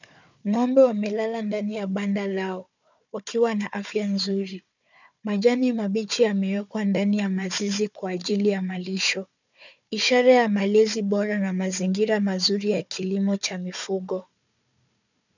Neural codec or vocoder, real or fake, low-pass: codec, 16 kHz, 4 kbps, FunCodec, trained on Chinese and English, 50 frames a second; fake; 7.2 kHz